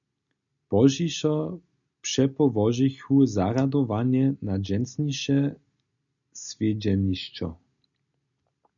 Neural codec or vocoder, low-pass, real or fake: none; 7.2 kHz; real